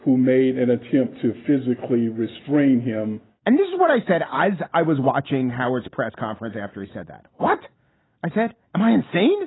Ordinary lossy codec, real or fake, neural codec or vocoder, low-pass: AAC, 16 kbps; real; none; 7.2 kHz